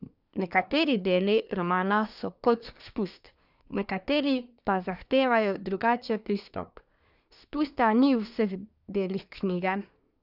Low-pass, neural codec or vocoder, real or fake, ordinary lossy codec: 5.4 kHz; codec, 24 kHz, 1 kbps, SNAC; fake; none